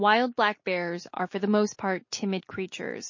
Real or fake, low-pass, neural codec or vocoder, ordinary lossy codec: real; 7.2 kHz; none; MP3, 32 kbps